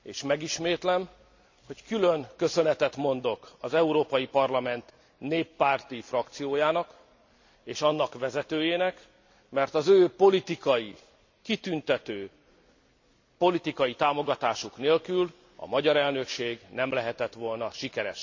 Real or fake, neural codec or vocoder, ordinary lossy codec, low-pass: real; none; MP3, 64 kbps; 7.2 kHz